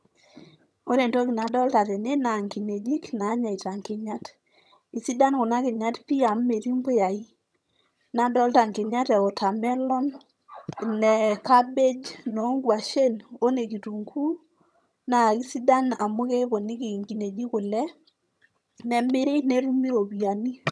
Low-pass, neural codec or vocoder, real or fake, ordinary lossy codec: none; vocoder, 22.05 kHz, 80 mel bands, HiFi-GAN; fake; none